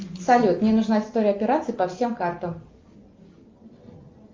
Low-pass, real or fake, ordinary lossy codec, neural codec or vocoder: 7.2 kHz; real; Opus, 32 kbps; none